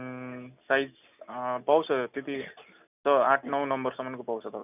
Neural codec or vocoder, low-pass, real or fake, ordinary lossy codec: none; 3.6 kHz; real; none